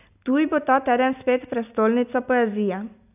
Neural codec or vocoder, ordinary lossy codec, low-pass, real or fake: none; none; 3.6 kHz; real